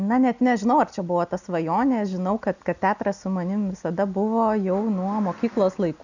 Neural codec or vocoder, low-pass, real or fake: none; 7.2 kHz; real